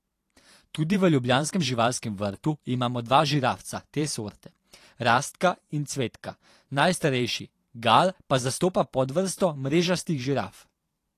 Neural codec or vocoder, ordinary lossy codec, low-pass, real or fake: vocoder, 44.1 kHz, 128 mel bands every 512 samples, BigVGAN v2; AAC, 48 kbps; 14.4 kHz; fake